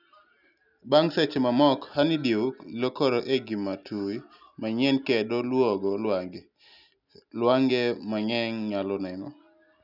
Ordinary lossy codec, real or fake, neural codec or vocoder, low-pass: none; real; none; 5.4 kHz